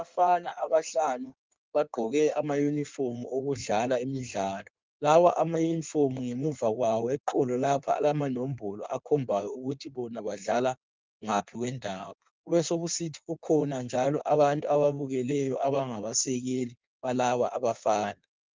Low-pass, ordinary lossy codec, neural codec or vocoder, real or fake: 7.2 kHz; Opus, 24 kbps; codec, 16 kHz in and 24 kHz out, 1.1 kbps, FireRedTTS-2 codec; fake